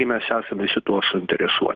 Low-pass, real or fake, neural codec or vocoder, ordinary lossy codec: 7.2 kHz; real; none; Opus, 24 kbps